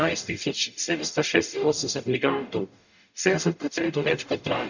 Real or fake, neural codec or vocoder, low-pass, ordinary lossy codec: fake; codec, 44.1 kHz, 0.9 kbps, DAC; 7.2 kHz; none